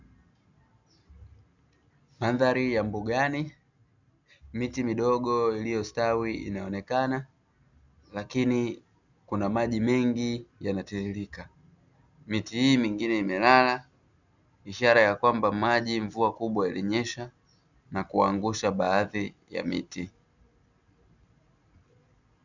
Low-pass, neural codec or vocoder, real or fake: 7.2 kHz; none; real